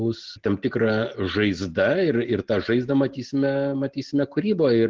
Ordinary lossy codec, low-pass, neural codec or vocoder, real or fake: Opus, 16 kbps; 7.2 kHz; none; real